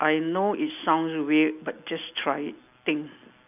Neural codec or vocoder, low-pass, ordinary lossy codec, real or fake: none; 3.6 kHz; none; real